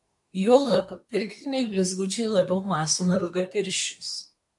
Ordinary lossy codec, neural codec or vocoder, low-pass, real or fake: MP3, 64 kbps; codec, 24 kHz, 1 kbps, SNAC; 10.8 kHz; fake